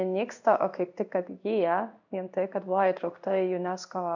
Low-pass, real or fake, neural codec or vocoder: 7.2 kHz; fake; codec, 16 kHz in and 24 kHz out, 1 kbps, XY-Tokenizer